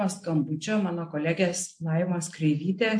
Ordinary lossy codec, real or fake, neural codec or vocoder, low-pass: MP3, 48 kbps; real; none; 9.9 kHz